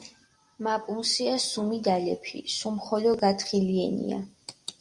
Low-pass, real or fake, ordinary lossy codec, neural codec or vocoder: 10.8 kHz; real; Opus, 64 kbps; none